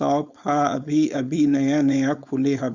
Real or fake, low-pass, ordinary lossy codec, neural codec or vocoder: fake; 7.2 kHz; Opus, 64 kbps; codec, 16 kHz, 4.8 kbps, FACodec